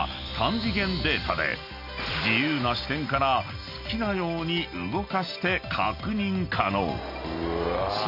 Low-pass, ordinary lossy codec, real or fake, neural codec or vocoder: 5.4 kHz; none; real; none